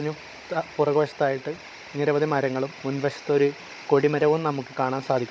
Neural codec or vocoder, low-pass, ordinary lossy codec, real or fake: codec, 16 kHz, 16 kbps, FunCodec, trained on Chinese and English, 50 frames a second; none; none; fake